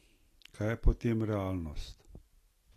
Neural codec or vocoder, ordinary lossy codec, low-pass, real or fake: none; AAC, 48 kbps; 14.4 kHz; real